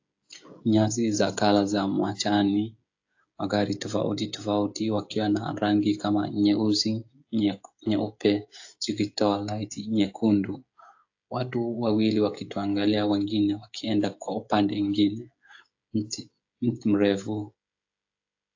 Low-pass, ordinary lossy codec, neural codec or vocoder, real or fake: 7.2 kHz; AAC, 48 kbps; codec, 16 kHz, 16 kbps, FreqCodec, smaller model; fake